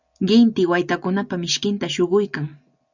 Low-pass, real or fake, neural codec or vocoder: 7.2 kHz; real; none